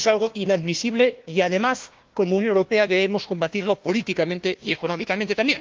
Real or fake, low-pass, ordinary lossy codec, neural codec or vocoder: fake; 7.2 kHz; Opus, 32 kbps; codec, 16 kHz, 1 kbps, FunCodec, trained on Chinese and English, 50 frames a second